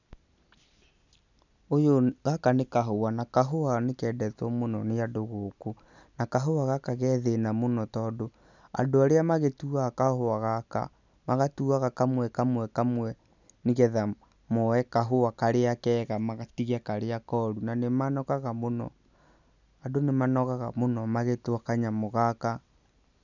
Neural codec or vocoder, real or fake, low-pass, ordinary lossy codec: none; real; 7.2 kHz; none